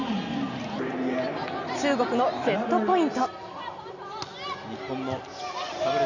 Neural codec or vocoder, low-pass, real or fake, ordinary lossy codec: none; 7.2 kHz; real; none